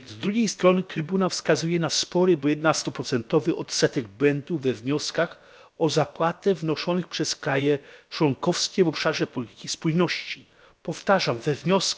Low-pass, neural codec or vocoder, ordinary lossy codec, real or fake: none; codec, 16 kHz, about 1 kbps, DyCAST, with the encoder's durations; none; fake